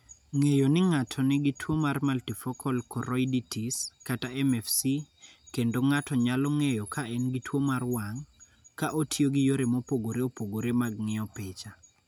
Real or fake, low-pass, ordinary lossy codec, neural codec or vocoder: real; none; none; none